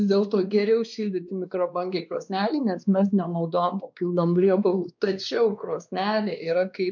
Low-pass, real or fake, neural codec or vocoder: 7.2 kHz; fake; codec, 16 kHz, 2 kbps, X-Codec, WavLM features, trained on Multilingual LibriSpeech